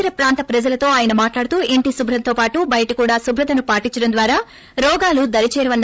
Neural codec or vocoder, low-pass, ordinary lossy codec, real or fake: codec, 16 kHz, 16 kbps, FreqCodec, larger model; none; none; fake